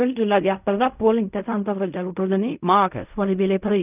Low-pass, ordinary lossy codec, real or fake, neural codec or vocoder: 3.6 kHz; none; fake; codec, 16 kHz in and 24 kHz out, 0.4 kbps, LongCat-Audio-Codec, fine tuned four codebook decoder